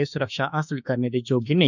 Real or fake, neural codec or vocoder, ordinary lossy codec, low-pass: fake; autoencoder, 48 kHz, 32 numbers a frame, DAC-VAE, trained on Japanese speech; none; 7.2 kHz